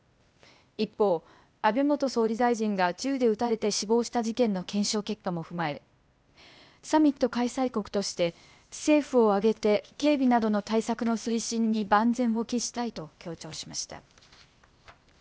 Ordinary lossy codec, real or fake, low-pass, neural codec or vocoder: none; fake; none; codec, 16 kHz, 0.8 kbps, ZipCodec